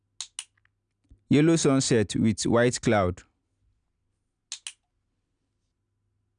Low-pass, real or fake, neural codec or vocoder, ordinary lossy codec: 9.9 kHz; real; none; none